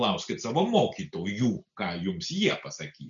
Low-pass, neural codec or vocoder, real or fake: 7.2 kHz; none; real